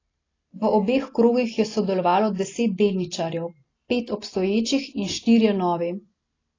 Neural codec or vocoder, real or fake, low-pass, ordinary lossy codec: none; real; 7.2 kHz; AAC, 32 kbps